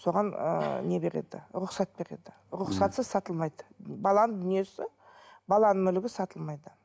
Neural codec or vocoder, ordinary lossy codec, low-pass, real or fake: none; none; none; real